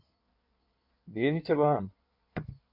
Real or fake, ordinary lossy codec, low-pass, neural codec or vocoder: fake; MP3, 48 kbps; 5.4 kHz; codec, 16 kHz in and 24 kHz out, 1.1 kbps, FireRedTTS-2 codec